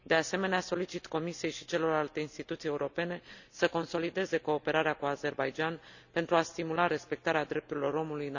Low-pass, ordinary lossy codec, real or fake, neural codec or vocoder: 7.2 kHz; none; real; none